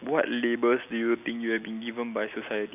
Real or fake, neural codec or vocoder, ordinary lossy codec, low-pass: real; none; none; 3.6 kHz